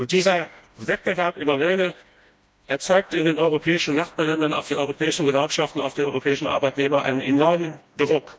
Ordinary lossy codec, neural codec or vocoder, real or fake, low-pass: none; codec, 16 kHz, 1 kbps, FreqCodec, smaller model; fake; none